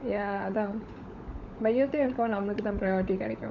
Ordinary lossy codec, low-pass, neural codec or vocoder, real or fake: none; 7.2 kHz; codec, 16 kHz, 16 kbps, FunCodec, trained on LibriTTS, 50 frames a second; fake